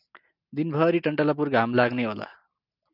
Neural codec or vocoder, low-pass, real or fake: none; 5.4 kHz; real